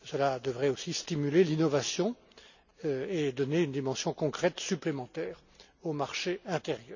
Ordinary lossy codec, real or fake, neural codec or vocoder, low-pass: none; real; none; 7.2 kHz